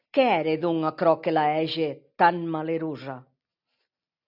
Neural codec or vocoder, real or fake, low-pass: none; real; 5.4 kHz